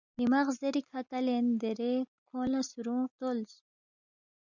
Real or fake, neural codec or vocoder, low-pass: real; none; 7.2 kHz